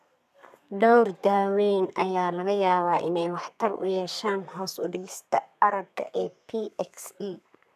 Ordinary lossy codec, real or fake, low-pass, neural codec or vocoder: none; fake; 14.4 kHz; codec, 32 kHz, 1.9 kbps, SNAC